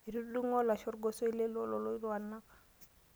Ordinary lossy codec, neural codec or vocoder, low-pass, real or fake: none; none; none; real